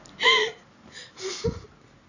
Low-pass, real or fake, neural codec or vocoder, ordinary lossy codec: 7.2 kHz; real; none; none